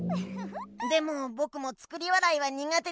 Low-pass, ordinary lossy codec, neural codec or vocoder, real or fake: none; none; none; real